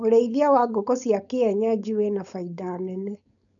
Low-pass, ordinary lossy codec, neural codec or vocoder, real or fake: 7.2 kHz; none; codec, 16 kHz, 4.8 kbps, FACodec; fake